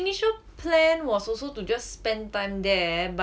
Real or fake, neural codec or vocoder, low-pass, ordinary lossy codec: real; none; none; none